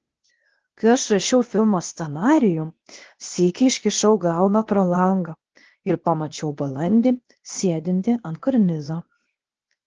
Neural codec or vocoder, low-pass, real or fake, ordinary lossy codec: codec, 16 kHz, 0.8 kbps, ZipCodec; 7.2 kHz; fake; Opus, 16 kbps